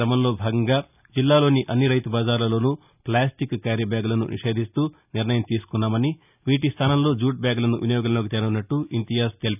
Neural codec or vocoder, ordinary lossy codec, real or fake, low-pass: none; none; real; 3.6 kHz